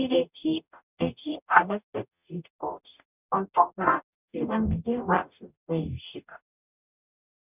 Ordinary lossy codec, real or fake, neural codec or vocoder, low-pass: none; fake; codec, 44.1 kHz, 0.9 kbps, DAC; 3.6 kHz